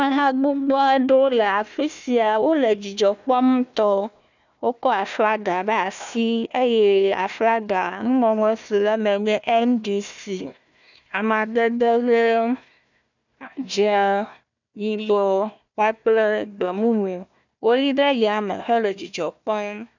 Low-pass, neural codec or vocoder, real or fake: 7.2 kHz; codec, 16 kHz, 1 kbps, FunCodec, trained on Chinese and English, 50 frames a second; fake